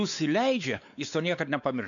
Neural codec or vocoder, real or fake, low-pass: codec, 16 kHz, 4 kbps, X-Codec, WavLM features, trained on Multilingual LibriSpeech; fake; 7.2 kHz